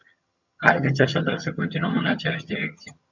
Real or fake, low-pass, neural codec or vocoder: fake; 7.2 kHz; vocoder, 22.05 kHz, 80 mel bands, HiFi-GAN